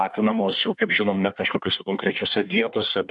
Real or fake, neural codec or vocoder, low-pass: fake; codec, 24 kHz, 1 kbps, SNAC; 10.8 kHz